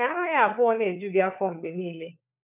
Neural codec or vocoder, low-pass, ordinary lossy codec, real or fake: codec, 16 kHz, 4 kbps, FunCodec, trained on LibriTTS, 50 frames a second; 3.6 kHz; none; fake